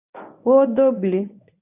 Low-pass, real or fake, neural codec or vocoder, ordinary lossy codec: 3.6 kHz; fake; codec, 24 kHz, 0.9 kbps, WavTokenizer, medium speech release version 1; AAC, 32 kbps